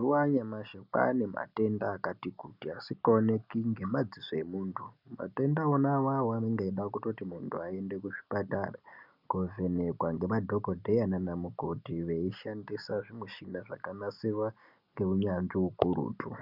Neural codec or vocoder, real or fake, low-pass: none; real; 5.4 kHz